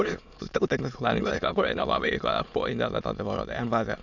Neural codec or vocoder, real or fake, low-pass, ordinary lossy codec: autoencoder, 22.05 kHz, a latent of 192 numbers a frame, VITS, trained on many speakers; fake; 7.2 kHz; none